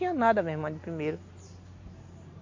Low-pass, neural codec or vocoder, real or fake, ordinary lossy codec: 7.2 kHz; none; real; MP3, 48 kbps